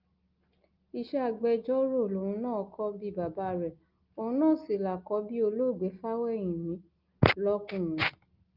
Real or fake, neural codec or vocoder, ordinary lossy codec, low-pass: real; none; Opus, 32 kbps; 5.4 kHz